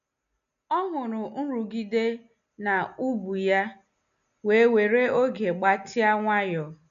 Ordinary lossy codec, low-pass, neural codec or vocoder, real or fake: none; 7.2 kHz; none; real